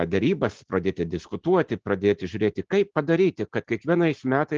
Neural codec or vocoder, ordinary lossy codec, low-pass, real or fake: none; Opus, 16 kbps; 7.2 kHz; real